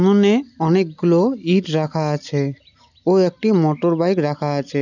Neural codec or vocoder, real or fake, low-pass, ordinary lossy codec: none; real; 7.2 kHz; none